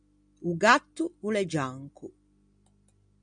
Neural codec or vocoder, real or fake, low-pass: none; real; 9.9 kHz